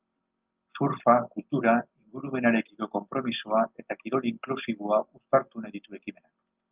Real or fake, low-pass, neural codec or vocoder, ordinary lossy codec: real; 3.6 kHz; none; Opus, 24 kbps